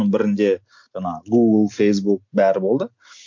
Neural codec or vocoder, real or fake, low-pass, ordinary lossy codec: none; real; 7.2 kHz; MP3, 48 kbps